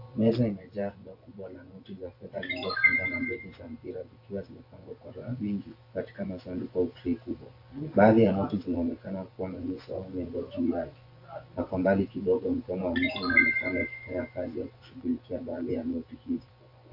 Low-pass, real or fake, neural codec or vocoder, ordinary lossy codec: 5.4 kHz; fake; vocoder, 24 kHz, 100 mel bands, Vocos; AAC, 32 kbps